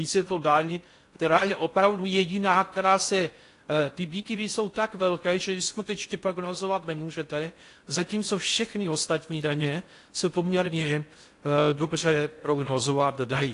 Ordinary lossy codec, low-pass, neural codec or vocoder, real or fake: AAC, 48 kbps; 10.8 kHz; codec, 16 kHz in and 24 kHz out, 0.6 kbps, FocalCodec, streaming, 4096 codes; fake